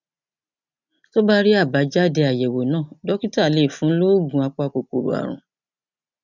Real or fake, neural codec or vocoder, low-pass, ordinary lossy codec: real; none; 7.2 kHz; none